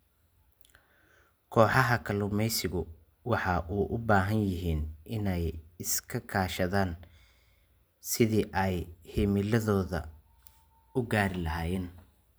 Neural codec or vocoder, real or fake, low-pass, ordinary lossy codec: none; real; none; none